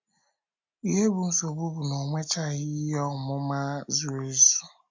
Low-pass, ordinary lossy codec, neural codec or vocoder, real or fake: 7.2 kHz; MP3, 64 kbps; none; real